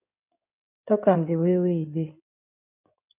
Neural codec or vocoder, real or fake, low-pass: codec, 16 kHz in and 24 kHz out, 2.2 kbps, FireRedTTS-2 codec; fake; 3.6 kHz